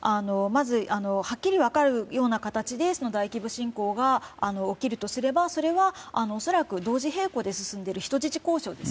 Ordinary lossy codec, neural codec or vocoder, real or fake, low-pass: none; none; real; none